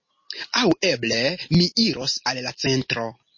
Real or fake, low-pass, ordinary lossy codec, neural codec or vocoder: real; 7.2 kHz; MP3, 32 kbps; none